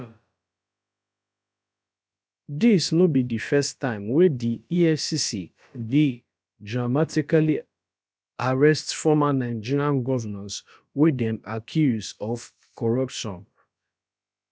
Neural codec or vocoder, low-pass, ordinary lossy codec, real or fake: codec, 16 kHz, about 1 kbps, DyCAST, with the encoder's durations; none; none; fake